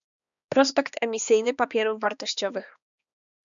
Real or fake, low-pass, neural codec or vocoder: fake; 7.2 kHz; codec, 16 kHz, 2 kbps, X-Codec, HuBERT features, trained on balanced general audio